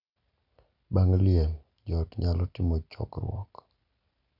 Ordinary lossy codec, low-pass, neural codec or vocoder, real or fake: none; 5.4 kHz; none; real